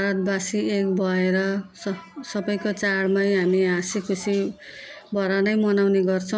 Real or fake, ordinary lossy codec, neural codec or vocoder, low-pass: real; none; none; none